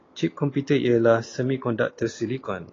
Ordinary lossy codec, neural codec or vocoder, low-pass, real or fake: AAC, 32 kbps; none; 7.2 kHz; real